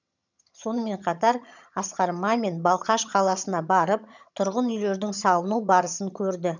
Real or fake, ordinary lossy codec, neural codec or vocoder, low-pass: fake; none; vocoder, 22.05 kHz, 80 mel bands, HiFi-GAN; 7.2 kHz